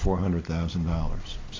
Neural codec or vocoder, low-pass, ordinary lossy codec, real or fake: none; 7.2 kHz; AAC, 32 kbps; real